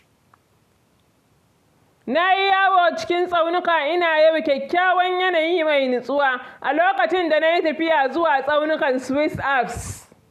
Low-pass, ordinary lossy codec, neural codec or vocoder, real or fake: 14.4 kHz; none; none; real